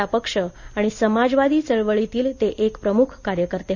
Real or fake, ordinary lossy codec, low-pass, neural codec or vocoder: real; none; 7.2 kHz; none